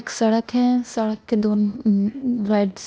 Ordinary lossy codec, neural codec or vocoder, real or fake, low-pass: none; codec, 16 kHz, 0.8 kbps, ZipCodec; fake; none